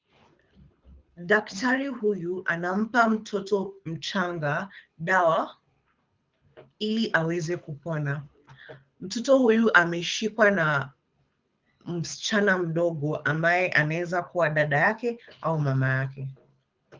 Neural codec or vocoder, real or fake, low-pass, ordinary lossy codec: codec, 24 kHz, 6 kbps, HILCodec; fake; 7.2 kHz; Opus, 32 kbps